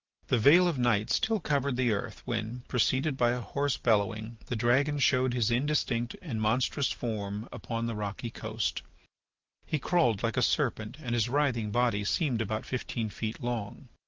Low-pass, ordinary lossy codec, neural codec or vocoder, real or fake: 7.2 kHz; Opus, 32 kbps; none; real